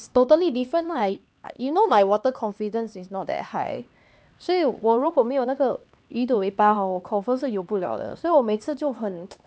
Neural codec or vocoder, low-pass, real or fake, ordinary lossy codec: codec, 16 kHz, 2 kbps, X-Codec, HuBERT features, trained on LibriSpeech; none; fake; none